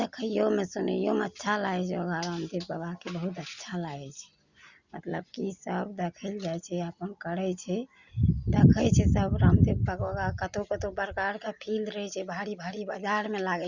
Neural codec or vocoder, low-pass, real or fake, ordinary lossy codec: none; 7.2 kHz; real; Opus, 64 kbps